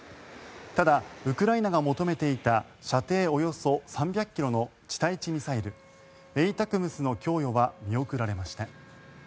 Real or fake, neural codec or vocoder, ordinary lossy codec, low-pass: real; none; none; none